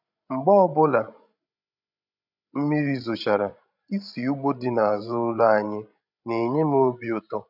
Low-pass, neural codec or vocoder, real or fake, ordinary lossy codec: 5.4 kHz; codec, 16 kHz, 16 kbps, FreqCodec, larger model; fake; none